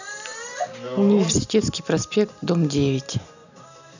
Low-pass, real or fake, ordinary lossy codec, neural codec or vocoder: 7.2 kHz; real; none; none